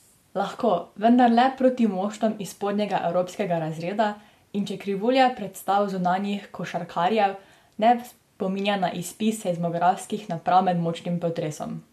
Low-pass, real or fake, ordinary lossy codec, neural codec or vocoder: 14.4 kHz; real; MP3, 64 kbps; none